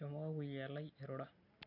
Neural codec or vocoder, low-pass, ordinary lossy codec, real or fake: none; 5.4 kHz; none; real